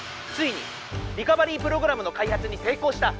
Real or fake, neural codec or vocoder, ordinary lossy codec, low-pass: real; none; none; none